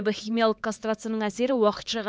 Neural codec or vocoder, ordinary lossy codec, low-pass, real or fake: codec, 16 kHz, 4 kbps, X-Codec, HuBERT features, trained on LibriSpeech; none; none; fake